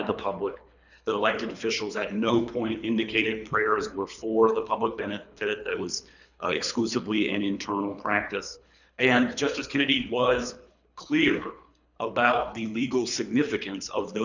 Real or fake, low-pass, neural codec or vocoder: fake; 7.2 kHz; codec, 24 kHz, 3 kbps, HILCodec